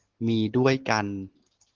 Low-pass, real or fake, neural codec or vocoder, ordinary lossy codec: 7.2 kHz; real; none; Opus, 16 kbps